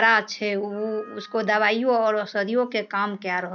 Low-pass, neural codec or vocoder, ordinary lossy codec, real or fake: 7.2 kHz; none; none; real